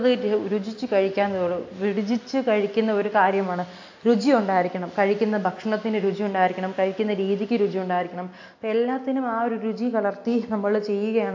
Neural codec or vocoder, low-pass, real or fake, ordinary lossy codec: none; 7.2 kHz; real; AAC, 48 kbps